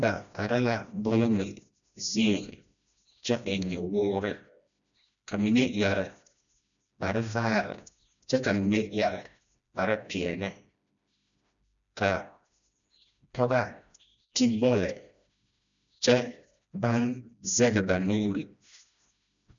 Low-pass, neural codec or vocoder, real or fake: 7.2 kHz; codec, 16 kHz, 1 kbps, FreqCodec, smaller model; fake